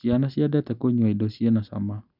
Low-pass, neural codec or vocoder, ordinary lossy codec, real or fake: 5.4 kHz; none; none; real